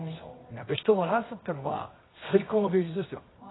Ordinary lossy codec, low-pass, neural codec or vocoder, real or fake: AAC, 16 kbps; 7.2 kHz; codec, 24 kHz, 0.9 kbps, WavTokenizer, medium music audio release; fake